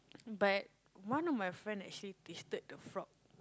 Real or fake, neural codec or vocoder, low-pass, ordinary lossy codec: real; none; none; none